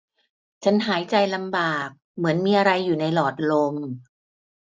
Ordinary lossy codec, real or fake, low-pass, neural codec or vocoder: none; real; none; none